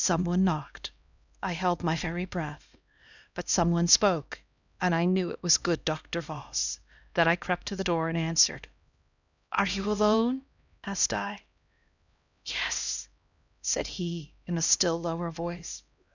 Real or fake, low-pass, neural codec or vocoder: fake; 7.2 kHz; codec, 16 kHz, 1 kbps, X-Codec, HuBERT features, trained on LibriSpeech